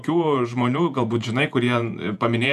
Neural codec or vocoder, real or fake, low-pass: vocoder, 48 kHz, 128 mel bands, Vocos; fake; 14.4 kHz